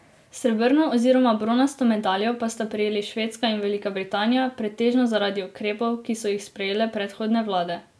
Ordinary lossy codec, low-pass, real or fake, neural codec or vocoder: none; none; real; none